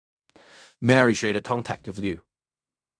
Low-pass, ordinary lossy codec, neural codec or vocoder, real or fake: 9.9 kHz; none; codec, 16 kHz in and 24 kHz out, 0.4 kbps, LongCat-Audio-Codec, fine tuned four codebook decoder; fake